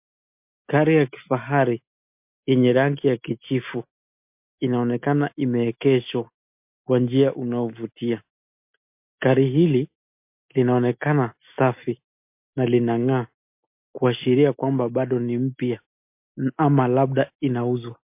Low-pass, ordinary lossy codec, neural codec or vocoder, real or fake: 3.6 kHz; MP3, 32 kbps; none; real